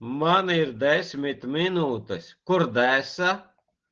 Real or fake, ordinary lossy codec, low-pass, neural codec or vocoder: real; Opus, 16 kbps; 7.2 kHz; none